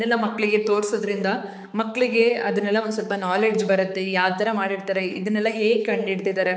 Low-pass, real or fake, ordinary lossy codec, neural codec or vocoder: none; fake; none; codec, 16 kHz, 4 kbps, X-Codec, HuBERT features, trained on balanced general audio